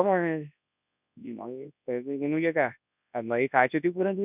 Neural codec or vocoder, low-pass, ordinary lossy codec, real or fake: codec, 24 kHz, 0.9 kbps, WavTokenizer, large speech release; 3.6 kHz; none; fake